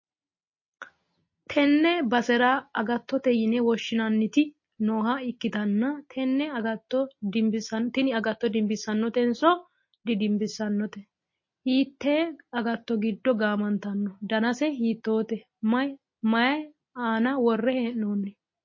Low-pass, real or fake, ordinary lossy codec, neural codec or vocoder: 7.2 kHz; real; MP3, 32 kbps; none